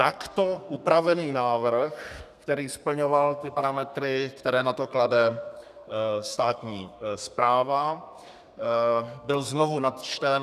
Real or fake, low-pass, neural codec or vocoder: fake; 14.4 kHz; codec, 32 kHz, 1.9 kbps, SNAC